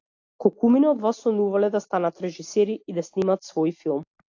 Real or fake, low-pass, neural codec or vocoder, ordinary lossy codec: real; 7.2 kHz; none; AAC, 48 kbps